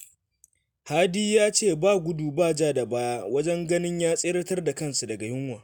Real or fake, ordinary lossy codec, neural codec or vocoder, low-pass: real; none; none; none